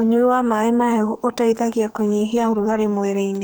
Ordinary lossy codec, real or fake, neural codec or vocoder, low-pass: none; fake; codec, 44.1 kHz, 2.6 kbps, SNAC; none